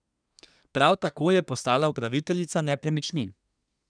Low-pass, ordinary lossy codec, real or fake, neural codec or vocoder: 9.9 kHz; none; fake; codec, 24 kHz, 1 kbps, SNAC